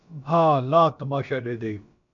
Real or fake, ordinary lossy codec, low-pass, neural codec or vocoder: fake; MP3, 64 kbps; 7.2 kHz; codec, 16 kHz, about 1 kbps, DyCAST, with the encoder's durations